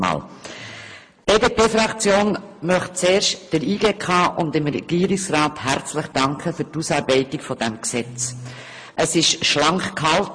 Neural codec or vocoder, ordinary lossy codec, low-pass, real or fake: none; MP3, 48 kbps; 9.9 kHz; real